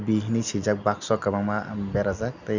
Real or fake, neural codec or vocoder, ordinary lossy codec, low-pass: real; none; Opus, 64 kbps; 7.2 kHz